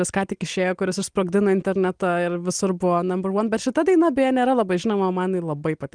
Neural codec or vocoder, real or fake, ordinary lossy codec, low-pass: none; real; Opus, 24 kbps; 9.9 kHz